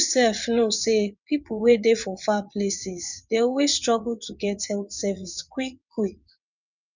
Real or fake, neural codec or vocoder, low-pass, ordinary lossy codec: fake; vocoder, 22.05 kHz, 80 mel bands, WaveNeXt; 7.2 kHz; none